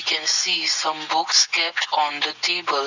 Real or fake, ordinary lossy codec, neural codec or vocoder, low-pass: real; none; none; 7.2 kHz